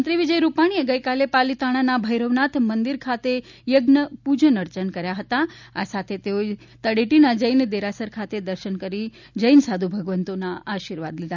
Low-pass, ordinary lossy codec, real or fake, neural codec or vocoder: 7.2 kHz; none; real; none